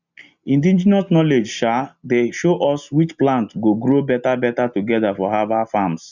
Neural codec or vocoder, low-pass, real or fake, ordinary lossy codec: none; 7.2 kHz; real; none